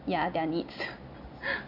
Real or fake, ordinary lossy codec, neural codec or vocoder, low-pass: real; none; none; 5.4 kHz